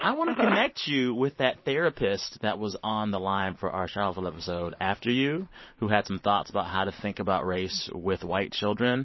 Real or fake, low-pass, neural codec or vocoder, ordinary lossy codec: fake; 7.2 kHz; vocoder, 44.1 kHz, 128 mel bands every 512 samples, BigVGAN v2; MP3, 24 kbps